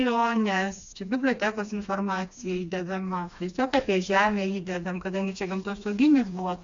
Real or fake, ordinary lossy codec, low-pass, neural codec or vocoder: fake; AAC, 64 kbps; 7.2 kHz; codec, 16 kHz, 2 kbps, FreqCodec, smaller model